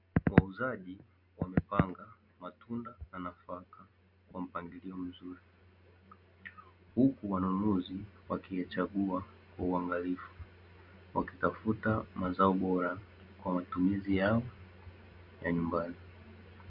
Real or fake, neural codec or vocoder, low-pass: real; none; 5.4 kHz